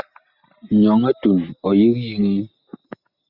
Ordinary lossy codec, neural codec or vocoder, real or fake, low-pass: Opus, 64 kbps; none; real; 5.4 kHz